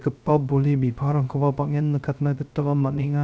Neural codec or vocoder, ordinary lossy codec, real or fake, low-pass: codec, 16 kHz, 0.3 kbps, FocalCodec; none; fake; none